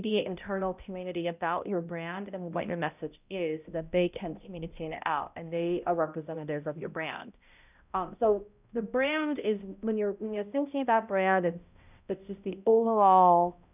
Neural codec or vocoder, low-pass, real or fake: codec, 16 kHz, 0.5 kbps, X-Codec, HuBERT features, trained on balanced general audio; 3.6 kHz; fake